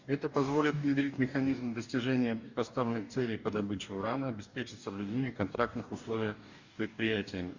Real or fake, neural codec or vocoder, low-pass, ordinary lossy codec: fake; codec, 44.1 kHz, 2.6 kbps, DAC; 7.2 kHz; Opus, 64 kbps